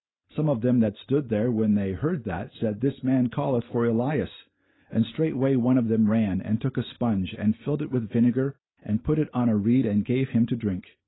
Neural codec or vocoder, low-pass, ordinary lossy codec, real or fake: none; 7.2 kHz; AAC, 16 kbps; real